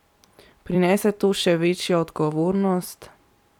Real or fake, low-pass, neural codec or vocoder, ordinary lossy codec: fake; 19.8 kHz; vocoder, 44.1 kHz, 128 mel bands every 256 samples, BigVGAN v2; none